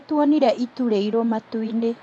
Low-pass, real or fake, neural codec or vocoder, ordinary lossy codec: none; fake; vocoder, 24 kHz, 100 mel bands, Vocos; none